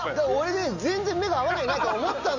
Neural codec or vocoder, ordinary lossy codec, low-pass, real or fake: none; none; 7.2 kHz; real